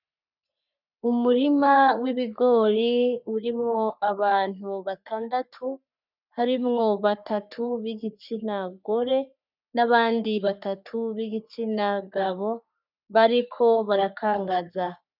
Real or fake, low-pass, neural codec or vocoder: fake; 5.4 kHz; codec, 44.1 kHz, 3.4 kbps, Pupu-Codec